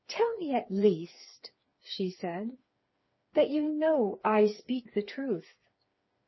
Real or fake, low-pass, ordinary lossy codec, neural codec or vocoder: fake; 7.2 kHz; MP3, 24 kbps; codec, 16 kHz, 4 kbps, FreqCodec, smaller model